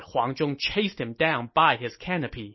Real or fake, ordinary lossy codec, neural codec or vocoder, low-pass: real; MP3, 24 kbps; none; 7.2 kHz